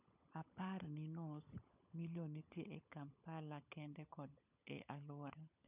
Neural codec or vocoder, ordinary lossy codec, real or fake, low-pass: codec, 16 kHz, 16 kbps, FunCodec, trained on LibriTTS, 50 frames a second; none; fake; 3.6 kHz